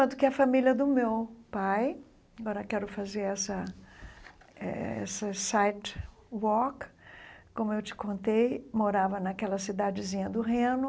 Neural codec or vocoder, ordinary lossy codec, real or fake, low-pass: none; none; real; none